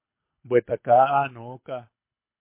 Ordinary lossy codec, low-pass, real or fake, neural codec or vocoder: MP3, 24 kbps; 3.6 kHz; fake; codec, 24 kHz, 6 kbps, HILCodec